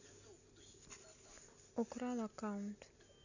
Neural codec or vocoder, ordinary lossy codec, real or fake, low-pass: none; none; real; 7.2 kHz